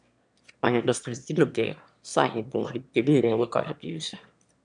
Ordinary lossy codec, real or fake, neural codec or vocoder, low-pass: MP3, 96 kbps; fake; autoencoder, 22.05 kHz, a latent of 192 numbers a frame, VITS, trained on one speaker; 9.9 kHz